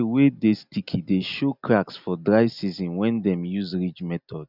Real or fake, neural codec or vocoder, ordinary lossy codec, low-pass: real; none; none; 5.4 kHz